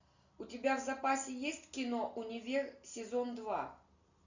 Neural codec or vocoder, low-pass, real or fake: none; 7.2 kHz; real